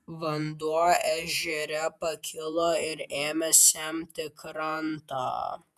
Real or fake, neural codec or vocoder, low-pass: fake; vocoder, 48 kHz, 128 mel bands, Vocos; 14.4 kHz